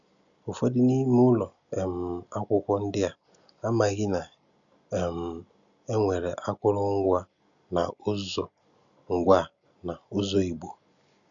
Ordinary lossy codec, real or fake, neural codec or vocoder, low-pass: none; real; none; 7.2 kHz